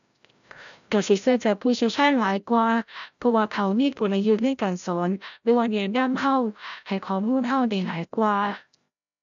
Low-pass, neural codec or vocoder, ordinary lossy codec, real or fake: 7.2 kHz; codec, 16 kHz, 0.5 kbps, FreqCodec, larger model; none; fake